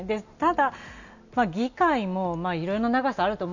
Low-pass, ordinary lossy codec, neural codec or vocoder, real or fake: 7.2 kHz; none; none; real